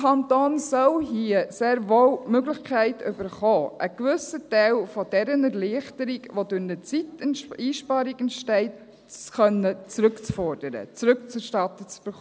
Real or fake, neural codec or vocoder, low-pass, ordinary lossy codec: real; none; none; none